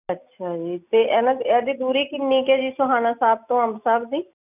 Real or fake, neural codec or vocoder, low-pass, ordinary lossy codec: real; none; 3.6 kHz; none